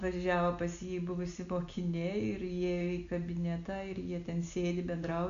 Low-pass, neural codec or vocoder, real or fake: 7.2 kHz; none; real